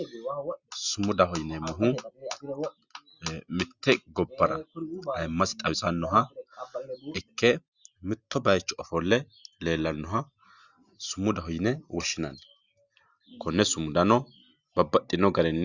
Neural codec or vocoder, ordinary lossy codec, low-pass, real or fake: none; Opus, 64 kbps; 7.2 kHz; real